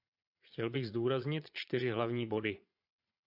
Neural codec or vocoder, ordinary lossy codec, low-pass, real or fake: vocoder, 22.05 kHz, 80 mel bands, Vocos; AAC, 48 kbps; 5.4 kHz; fake